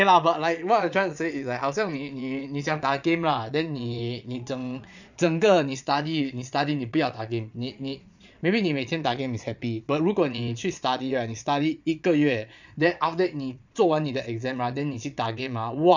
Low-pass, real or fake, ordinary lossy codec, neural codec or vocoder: 7.2 kHz; fake; none; vocoder, 22.05 kHz, 80 mel bands, Vocos